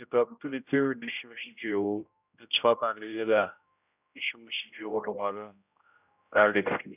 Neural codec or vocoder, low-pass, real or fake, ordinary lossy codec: codec, 16 kHz, 0.5 kbps, X-Codec, HuBERT features, trained on general audio; 3.6 kHz; fake; none